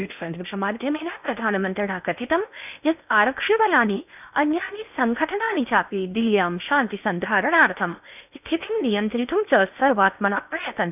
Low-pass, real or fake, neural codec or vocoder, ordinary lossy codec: 3.6 kHz; fake; codec, 16 kHz in and 24 kHz out, 0.8 kbps, FocalCodec, streaming, 65536 codes; none